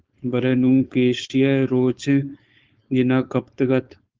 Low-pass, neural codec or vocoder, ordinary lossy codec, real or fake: 7.2 kHz; codec, 16 kHz, 4.8 kbps, FACodec; Opus, 32 kbps; fake